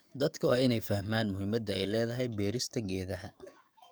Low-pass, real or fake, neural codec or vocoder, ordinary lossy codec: none; fake; codec, 44.1 kHz, 7.8 kbps, DAC; none